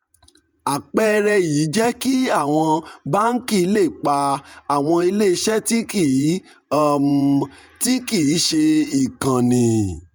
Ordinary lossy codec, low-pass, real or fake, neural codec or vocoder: none; none; fake; vocoder, 48 kHz, 128 mel bands, Vocos